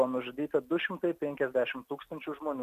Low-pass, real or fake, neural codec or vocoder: 14.4 kHz; real; none